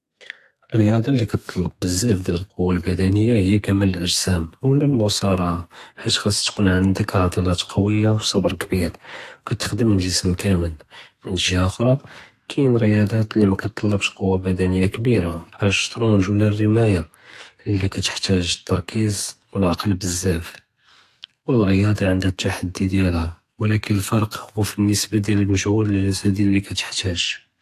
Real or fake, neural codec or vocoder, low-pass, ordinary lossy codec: fake; codec, 32 kHz, 1.9 kbps, SNAC; 14.4 kHz; AAC, 64 kbps